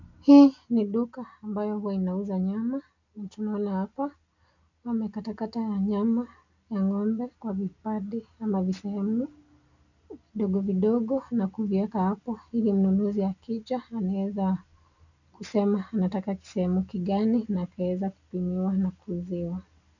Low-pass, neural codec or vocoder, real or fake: 7.2 kHz; none; real